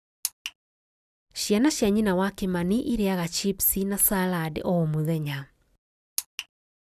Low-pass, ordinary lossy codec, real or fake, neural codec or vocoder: 14.4 kHz; none; real; none